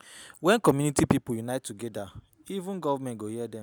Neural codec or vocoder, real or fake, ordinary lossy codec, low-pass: none; real; none; none